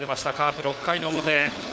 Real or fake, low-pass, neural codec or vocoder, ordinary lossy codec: fake; none; codec, 16 kHz, 4 kbps, FunCodec, trained on LibriTTS, 50 frames a second; none